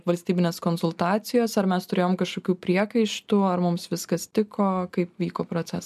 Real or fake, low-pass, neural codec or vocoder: real; 14.4 kHz; none